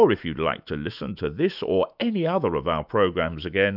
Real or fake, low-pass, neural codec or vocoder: fake; 5.4 kHz; autoencoder, 48 kHz, 128 numbers a frame, DAC-VAE, trained on Japanese speech